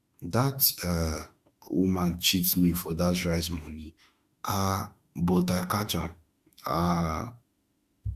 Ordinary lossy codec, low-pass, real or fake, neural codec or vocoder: Opus, 64 kbps; 14.4 kHz; fake; autoencoder, 48 kHz, 32 numbers a frame, DAC-VAE, trained on Japanese speech